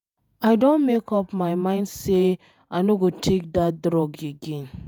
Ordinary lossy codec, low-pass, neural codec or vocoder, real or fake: none; none; vocoder, 48 kHz, 128 mel bands, Vocos; fake